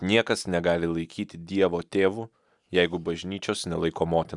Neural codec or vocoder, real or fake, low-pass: none; real; 10.8 kHz